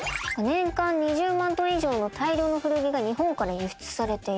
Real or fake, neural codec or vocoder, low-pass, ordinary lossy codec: real; none; none; none